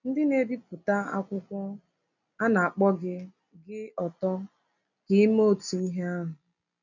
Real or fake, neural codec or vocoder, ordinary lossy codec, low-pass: real; none; none; 7.2 kHz